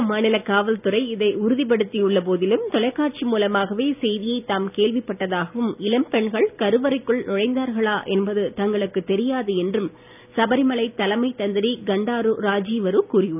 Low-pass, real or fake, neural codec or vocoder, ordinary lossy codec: 3.6 kHz; real; none; none